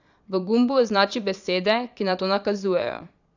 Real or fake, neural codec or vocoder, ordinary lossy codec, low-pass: fake; vocoder, 22.05 kHz, 80 mel bands, Vocos; none; 7.2 kHz